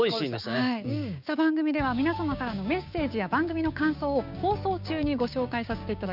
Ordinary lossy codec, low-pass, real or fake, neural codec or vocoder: none; 5.4 kHz; fake; codec, 16 kHz, 6 kbps, DAC